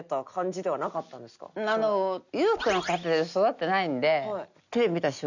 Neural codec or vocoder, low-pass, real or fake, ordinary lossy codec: none; 7.2 kHz; real; none